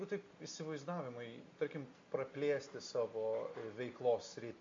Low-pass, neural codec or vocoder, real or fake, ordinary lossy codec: 7.2 kHz; none; real; MP3, 48 kbps